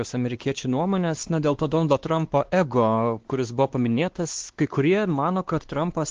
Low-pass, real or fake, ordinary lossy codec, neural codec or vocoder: 7.2 kHz; fake; Opus, 16 kbps; codec, 16 kHz, 2 kbps, X-Codec, WavLM features, trained on Multilingual LibriSpeech